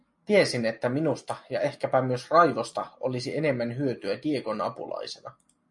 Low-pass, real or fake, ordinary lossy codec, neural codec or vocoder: 10.8 kHz; real; MP3, 48 kbps; none